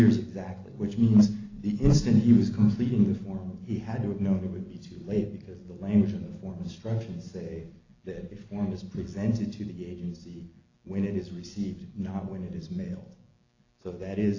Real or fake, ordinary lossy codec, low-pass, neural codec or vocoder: real; AAC, 32 kbps; 7.2 kHz; none